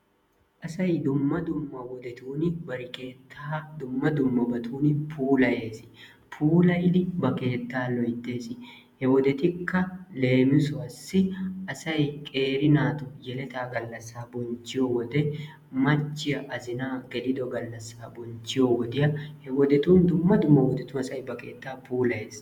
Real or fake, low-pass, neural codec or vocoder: fake; 19.8 kHz; vocoder, 48 kHz, 128 mel bands, Vocos